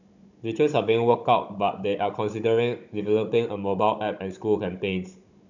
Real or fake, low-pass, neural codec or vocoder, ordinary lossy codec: fake; 7.2 kHz; codec, 16 kHz, 16 kbps, FunCodec, trained on Chinese and English, 50 frames a second; none